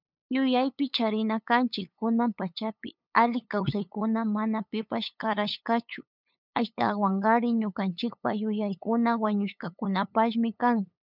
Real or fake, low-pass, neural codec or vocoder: fake; 5.4 kHz; codec, 16 kHz, 8 kbps, FunCodec, trained on LibriTTS, 25 frames a second